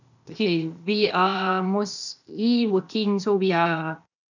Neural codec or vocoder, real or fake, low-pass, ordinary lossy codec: codec, 16 kHz, 0.8 kbps, ZipCodec; fake; 7.2 kHz; none